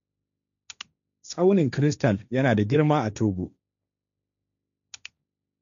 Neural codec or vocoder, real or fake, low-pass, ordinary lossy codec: codec, 16 kHz, 1.1 kbps, Voila-Tokenizer; fake; 7.2 kHz; none